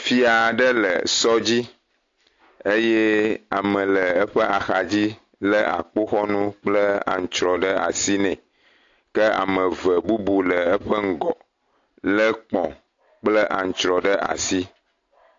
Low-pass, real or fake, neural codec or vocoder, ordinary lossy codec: 7.2 kHz; real; none; AAC, 48 kbps